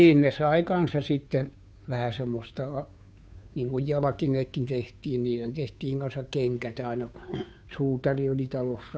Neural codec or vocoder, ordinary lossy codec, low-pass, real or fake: codec, 16 kHz, 2 kbps, FunCodec, trained on Chinese and English, 25 frames a second; none; none; fake